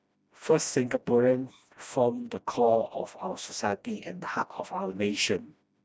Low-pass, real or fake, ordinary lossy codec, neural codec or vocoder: none; fake; none; codec, 16 kHz, 1 kbps, FreqCodec, smaller model